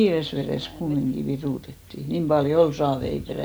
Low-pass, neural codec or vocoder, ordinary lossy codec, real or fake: none; none; none; real